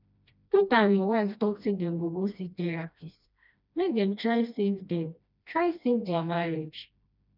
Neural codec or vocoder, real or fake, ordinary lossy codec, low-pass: codec, 16 kHz, 1 kbps, FreqCodec, smaller model; fake; none; 5.4 kHz